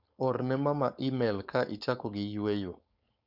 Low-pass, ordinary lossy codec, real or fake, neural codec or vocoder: 5.4 kHz; Opus, 64 kbps; fake; codec, 16 kHz, 4.8 kbps, FACodec